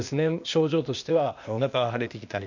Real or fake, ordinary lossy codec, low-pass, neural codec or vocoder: fake; none; 7.2 kHz; codec, 16 kHz, 0.8 kbps, ZipCodec